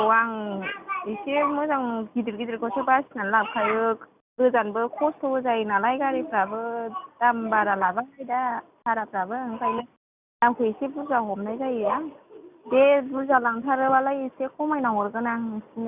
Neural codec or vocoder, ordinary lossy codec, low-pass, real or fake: none; Opus, 64 kbps; 3.6 kHz; real